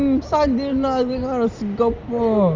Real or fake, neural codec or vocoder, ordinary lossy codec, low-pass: real; none; Opus, 32 kbps; 7.2 kHz